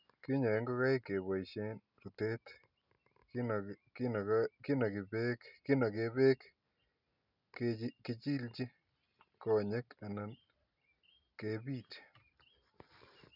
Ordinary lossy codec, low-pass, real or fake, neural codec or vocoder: none; 5.4 kHz; real; none